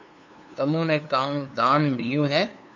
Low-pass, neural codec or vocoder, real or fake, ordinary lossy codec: 7.2 kHz; codec, 16 kHz, 2 kbps, FunCodec, trained on LibriTTS, 25 frames a second; fake; MP3, 64 kbps